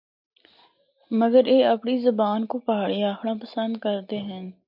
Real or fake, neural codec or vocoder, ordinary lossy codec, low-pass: real; none; MP3, 48 kbps; 5.4 kHz